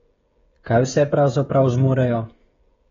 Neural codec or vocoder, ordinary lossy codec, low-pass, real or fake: codec, 16 kHz, 16 kbps, FreqCodec, smaller model; AAC, 24 kbps; 7.2 kHz; fake